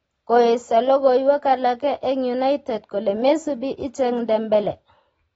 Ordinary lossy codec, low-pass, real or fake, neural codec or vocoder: AAC, 24 kbps; 19.8 kHz; real; none